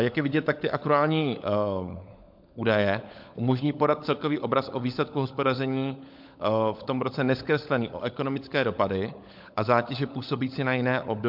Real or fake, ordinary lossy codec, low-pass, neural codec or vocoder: fake; MP3, 48 kbps; 5.4 kHz; codec, 16 kHz, 16 kbps, FunCodec, trained on LibriTTS, 50 frames a second